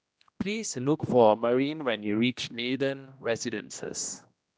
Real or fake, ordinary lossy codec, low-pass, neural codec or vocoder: fake; none; none; codec, 16 kHz, 1 kbps, X-Codec, HuBERT features, trained on general audio